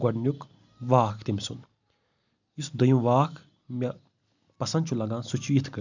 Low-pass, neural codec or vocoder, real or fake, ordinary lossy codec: 7.2 kHz; none; real; none